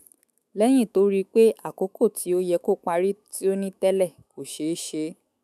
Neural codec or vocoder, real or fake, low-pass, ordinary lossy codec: autoencoder, 48 kHz, 128 numbers a frame, DAC-VAE, trained on Japanese speech; fake; 14.4 kHz; none